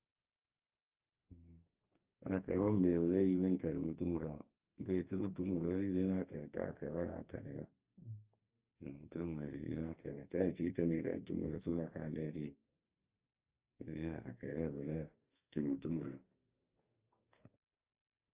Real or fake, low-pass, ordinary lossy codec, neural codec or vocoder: fake; 3.6 kHz; Opus, 24 kbps; codec, 44.1 kHz, 2.6 kbps, SNAC